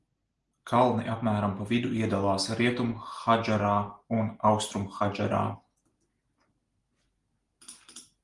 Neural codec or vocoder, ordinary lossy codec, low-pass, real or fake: none; Opus, 24 kbps; 10.8 kHz; real